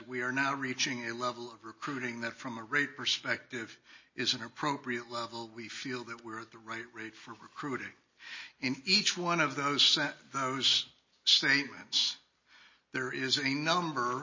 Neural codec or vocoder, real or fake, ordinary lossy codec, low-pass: none; real; MP3, 32 kbps; 7.2 kHz